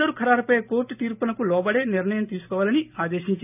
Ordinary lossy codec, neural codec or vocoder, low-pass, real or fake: none; none; 3.6 kHz; real